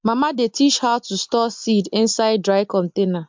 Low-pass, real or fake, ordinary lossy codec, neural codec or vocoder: 7.2 kHz; real; MP3, 64 kbps; none